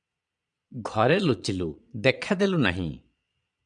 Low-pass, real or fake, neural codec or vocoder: 9.9 kHz; fake; vocoder, 22.05 kHz, 80 mel bands, Vocos